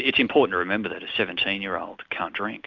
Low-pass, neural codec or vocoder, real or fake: 7.2 kHz; none; real